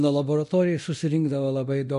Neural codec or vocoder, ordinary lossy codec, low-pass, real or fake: codec, 24 kHz, 0.9 kbps, DualCodec; MP3, 48 kbps; 10.8 kHz; fake